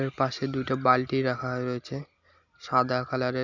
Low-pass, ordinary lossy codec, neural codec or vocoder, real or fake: 7.2 kHz; none; none; real